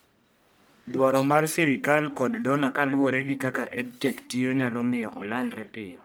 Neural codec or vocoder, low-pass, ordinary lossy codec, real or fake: codec, 44.1 kHz, 1.7 kbps, Pupu-Codec; none; none; fake